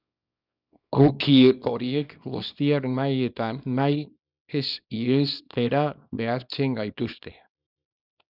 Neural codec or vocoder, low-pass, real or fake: codec, 24 kHz, 0.9 kbps, WavTokenizer, small release; 5.4 kHz; fake